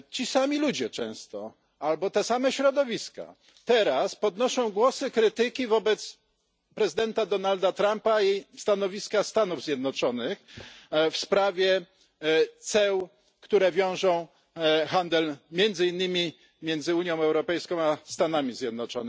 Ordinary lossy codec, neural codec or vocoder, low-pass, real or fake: none; none; none; real